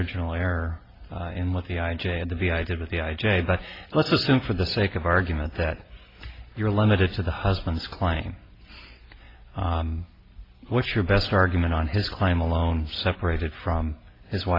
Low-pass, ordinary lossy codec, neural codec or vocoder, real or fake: 5.4 kHz; AAC, 24 kbps; none; real